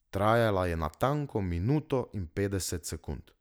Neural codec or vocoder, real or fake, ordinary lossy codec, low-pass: none; real; none; none